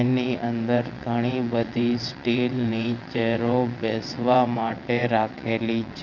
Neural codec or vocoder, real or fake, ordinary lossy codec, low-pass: vocoder, 22.05 kHz, 80 mel bands, WaveNeXt; fake; none; 7.2 kHz